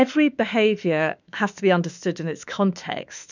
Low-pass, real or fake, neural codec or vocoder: 7.2 kHz; fake; autoencoder, 48 kHz, 32 numbers a frame, DAC-VAE, trained on Japanese speech